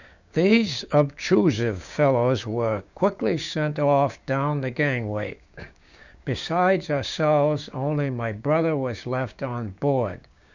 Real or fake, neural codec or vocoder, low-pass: fake; codec, 16 kHz, 6 kbps, DAC; 7.2 kHz